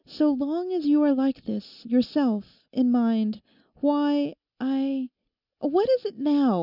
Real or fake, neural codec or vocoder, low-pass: real; none; 5.4 kHz